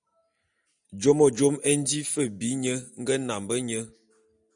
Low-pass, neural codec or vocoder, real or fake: 9.9 kHz; none; real